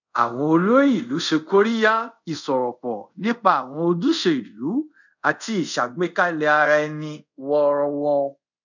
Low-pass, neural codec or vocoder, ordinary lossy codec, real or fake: 7.2 kHz; codec, 24 kHz, 0.5 kbps, DualCodec; none; fake